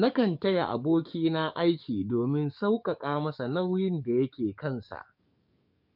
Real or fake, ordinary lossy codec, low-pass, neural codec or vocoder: fake; none; 5.4 kHz; codec, 44.1 kHz, 7.8 kbps, DAC